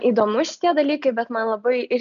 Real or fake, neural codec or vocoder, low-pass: real; none; 7.2 kHz